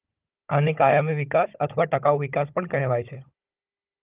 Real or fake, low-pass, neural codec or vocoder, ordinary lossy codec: fake; 3.6 kHz; codec, 16 kHz, 16 kbps, FunCodec, trained on Chinese and English, 50 frames a second; Opus, 32 kbps